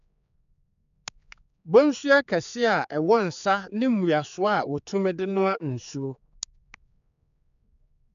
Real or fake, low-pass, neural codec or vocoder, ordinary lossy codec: fake; 7.2 kHz; codec, 16 kHz, 4 kbps, X-Codec, HuBERT features, trained on general audio; none